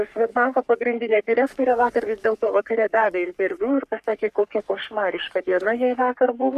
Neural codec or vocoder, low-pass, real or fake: codec, 44.1 kHz, 3.4 kbps, Pupu-Codec; 14.4 kHz; fake